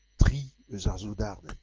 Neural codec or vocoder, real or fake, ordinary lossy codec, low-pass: none; real; Opus, 16 kbps; 7.2 kHz